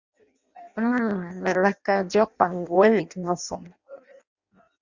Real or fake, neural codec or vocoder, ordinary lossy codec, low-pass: fake; codec, 16 kHz in and 24 kHz out, 0.6 kbps, FireRedTTS-2 codec; Opus, 64 kbps; 7.2 kHz